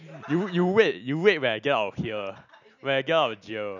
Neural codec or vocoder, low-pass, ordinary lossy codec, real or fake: none; 7.2 kHz; none; real